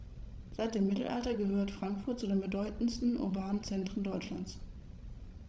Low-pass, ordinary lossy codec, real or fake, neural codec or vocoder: none; none; fake; codec, 16 kHz, 16 kbps, FreqCodec, larger model